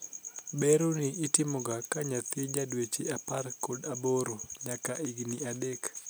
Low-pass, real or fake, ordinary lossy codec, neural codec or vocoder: none; real; none; none